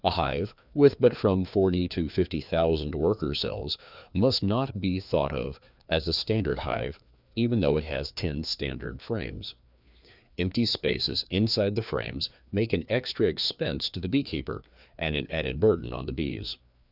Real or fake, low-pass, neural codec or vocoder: fake; 5.4 kHz; codec, 16 kHz, 2 kbps, FreqCodec, larger model